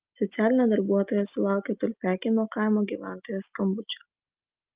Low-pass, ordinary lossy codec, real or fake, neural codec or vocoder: 3.6 kHz; Opus, 32 kbps; real; none